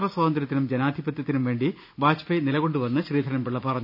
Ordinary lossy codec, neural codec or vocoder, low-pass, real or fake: none; none; 5.4 kHz; real